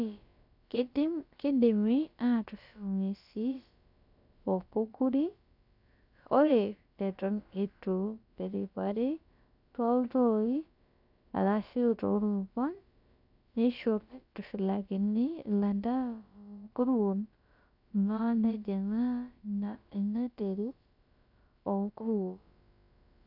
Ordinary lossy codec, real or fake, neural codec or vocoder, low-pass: none; fake; codec, 16 kHz, about 1 kbps, DyCAST, with the encoder's durations; 5.4 kHz